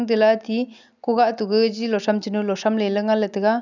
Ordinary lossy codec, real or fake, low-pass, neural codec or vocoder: none; real; 7.2 kHz; none